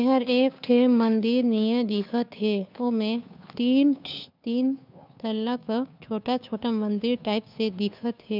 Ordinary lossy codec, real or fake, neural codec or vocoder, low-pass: none; fake; codec, 16 kHz, 2 kbps, FunCodec, trained on Chinese and English, 25 frames a second; 5.4 kHz